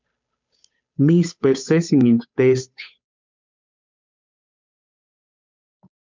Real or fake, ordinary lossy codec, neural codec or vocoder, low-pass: fake; MP3, 64 kbps; codec, 16 kHz, 8 kbps, FunCodec, trained on Chinese and English, 25 frames a second; 7.2 kHz